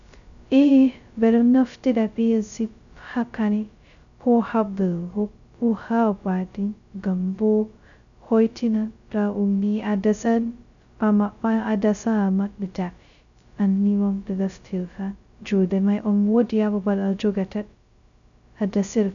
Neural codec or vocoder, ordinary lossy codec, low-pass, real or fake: codec, 16 kHz, 0.2 kbps, FocalCodec; MP3, 96 kbps; 7.2 kHz; fake